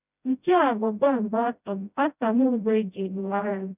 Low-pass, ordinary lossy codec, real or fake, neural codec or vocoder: 3.6 kHz; none; fake; codec, 16 kHz, 0.5 kbps, FreqCodec, smaller model